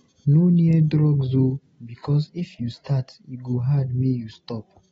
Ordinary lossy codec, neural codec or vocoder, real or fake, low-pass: AAC, 24 kbps; none; real; 19.8 kHz